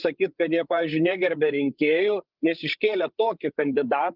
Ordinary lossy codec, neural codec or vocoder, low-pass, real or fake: Opus, 24 kbps; codec, 16 kHz, 8 kbps, FreqCodec, larger model; 5.4 kHz; fake